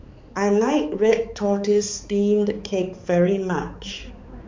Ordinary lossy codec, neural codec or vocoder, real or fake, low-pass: MP3, 64 kbps; codec, 16 kHz, 4 kbps, X-Codec, HuBERT features, trained on balanced general audio; fake; 7.2 kHz